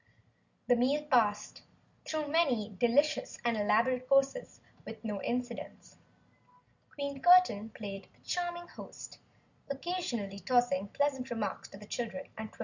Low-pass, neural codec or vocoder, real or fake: 7.2 kHz; none; real